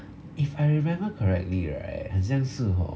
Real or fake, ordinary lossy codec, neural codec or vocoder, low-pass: real; none; none; none